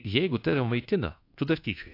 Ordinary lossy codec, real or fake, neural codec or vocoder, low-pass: AAC, 32 kbps; fake; codec, 24 kHz, 1.2 kbps, DualCodec; 5.4 kHz